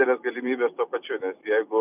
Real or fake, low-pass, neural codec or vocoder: fake; 3.6 kHz; vocoder, 44.1 kHz, 128 mel bands every 256 samples, BigVGAN v2